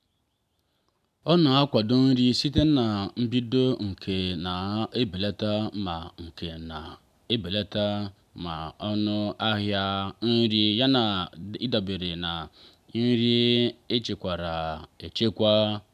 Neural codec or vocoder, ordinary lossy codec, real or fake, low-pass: none; none; real; 14.4 kHz